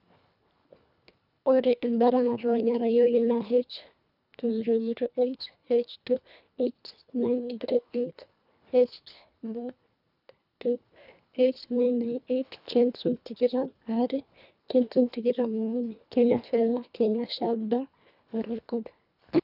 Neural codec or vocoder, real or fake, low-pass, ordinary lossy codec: codec, 24 kHz, 1.5 kbps, HILCodec; fake; 5.4 kHz; none